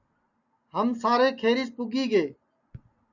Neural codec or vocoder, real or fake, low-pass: none; real; 7.2 kHz